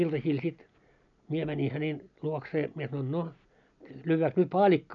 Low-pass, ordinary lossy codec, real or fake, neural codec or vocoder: 7.2 kHz; none; real; none